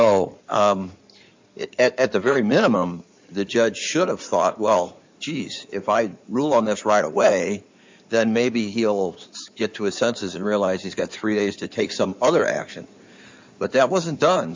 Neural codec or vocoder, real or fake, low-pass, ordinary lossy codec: codec, 16 kHz in and 24 kHz out, 2.2 kbps, FireRedTTS-2 codec; fake; 7.2 kHz; MP3, 64 kbps